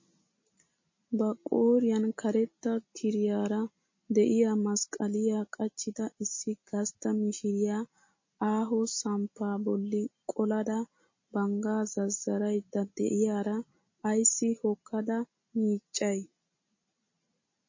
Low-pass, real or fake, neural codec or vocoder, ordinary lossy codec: 7.2 kHz; real; none; MP3, 32 kbps